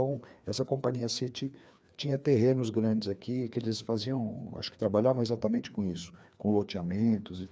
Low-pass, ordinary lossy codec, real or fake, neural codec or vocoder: none; none; fake; codec, 16 kHz, 2 kbps, FreqCodec, larger model